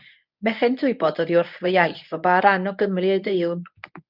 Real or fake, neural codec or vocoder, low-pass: fake; codec, 24 kHz, 0.9 kbps, WavTokenizer, medium speech release version 1; 5.4 kHz